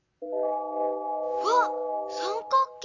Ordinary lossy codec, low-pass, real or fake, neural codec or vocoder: none; 7.2 kHz; real; none